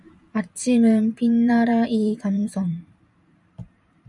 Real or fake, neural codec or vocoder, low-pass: fake; vocoder, 44.1 kHz, 128 mel bands every 512 samples, BigVGAN v2; 10.8 kHz